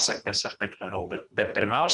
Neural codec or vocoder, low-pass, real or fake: codec, 24 kHz, 1.5 kbps, HILCodec; 10.8 kHz; fake